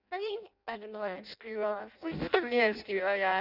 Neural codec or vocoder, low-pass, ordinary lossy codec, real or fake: codec, 16 kHz in and 24 kHz out, 0.6 kbps, FireRedTTS-2 codec; 5.4 kHz; none; fake